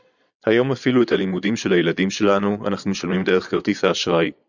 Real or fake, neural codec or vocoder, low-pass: fake; vocoder, 22.05 kHz, 80 mel bands, Vocos; 7.2 kHz